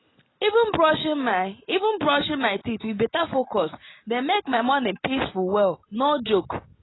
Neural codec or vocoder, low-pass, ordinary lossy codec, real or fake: none; 7.2 kHz; AAC, 16 kbps; real